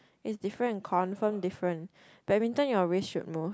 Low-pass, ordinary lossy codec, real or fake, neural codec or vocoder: none; none; real; none